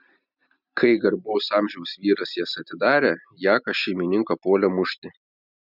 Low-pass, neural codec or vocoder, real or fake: 5.4 kHz; none; real